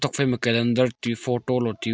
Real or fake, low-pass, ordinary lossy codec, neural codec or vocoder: real; none; none; none